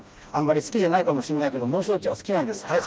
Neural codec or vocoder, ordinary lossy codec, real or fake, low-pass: codec, 16 kHz, 1 kbps, FreqCodec, smaller model; none; fake; none